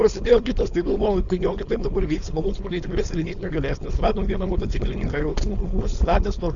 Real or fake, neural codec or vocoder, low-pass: fake; codec, 16 kHz, 4.8 kbps, FACodec; 7.2 kHz